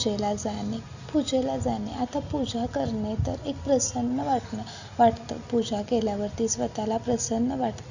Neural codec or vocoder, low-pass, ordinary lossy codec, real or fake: none; 7.2 kHz; none; real